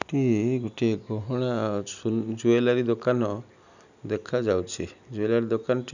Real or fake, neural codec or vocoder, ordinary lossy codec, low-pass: real; none; none; 7.2 kHz